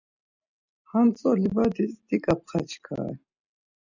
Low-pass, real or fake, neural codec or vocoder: 7.2 kHz; real; none